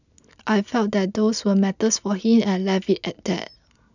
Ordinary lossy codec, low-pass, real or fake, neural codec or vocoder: none; 7.2 kHz; real; none